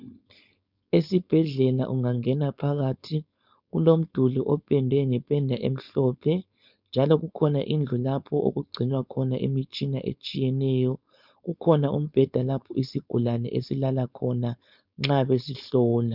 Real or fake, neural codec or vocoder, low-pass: fake; codec, 16 kHz, 4.8 kbps, FACodec; 5.4 kHz